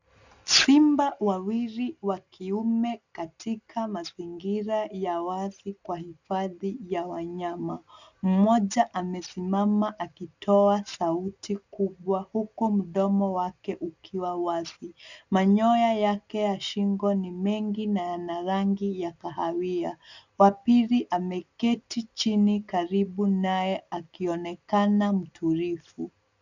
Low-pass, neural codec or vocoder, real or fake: 7.2 kHz; none; real